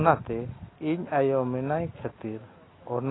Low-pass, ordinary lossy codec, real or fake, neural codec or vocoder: 7.2 kHz; AAC, 16 kbps; real; none